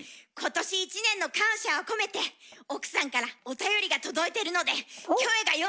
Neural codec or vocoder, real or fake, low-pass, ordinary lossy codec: none; real; none; none